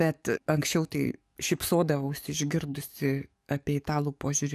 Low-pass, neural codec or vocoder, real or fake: 14.4 kHz; codec, 44.1 kHz, 7.8 kbps, DAC; fake